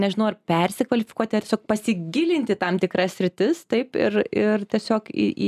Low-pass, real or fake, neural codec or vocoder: 14.4 kHz; real; none